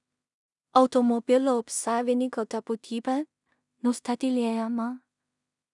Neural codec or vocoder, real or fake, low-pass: codec, 16 kHz in and 24 kHz out, 0.4 kbps, LongCat-Audio-Codec, two codebook decoder; fake; 10.8 kHz